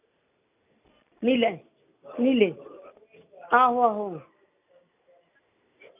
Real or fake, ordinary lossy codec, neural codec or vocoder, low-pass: real; none; none; 3.6 kHz